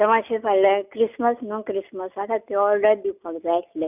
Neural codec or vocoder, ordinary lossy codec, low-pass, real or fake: none; none; 3.6 kHz; real